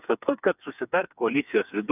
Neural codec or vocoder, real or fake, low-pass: codec, 16 kHz, 4 kbps, FreqCodec, smaller model; fake; 3.6 kHz